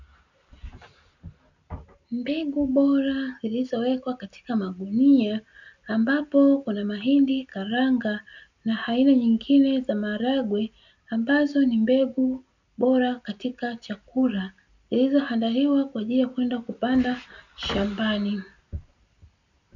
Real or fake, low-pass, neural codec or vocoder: real; 7.2 kHz; none